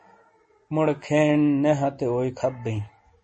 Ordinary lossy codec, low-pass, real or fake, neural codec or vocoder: MP3, 32 kbps; 9.9 kHz; real; none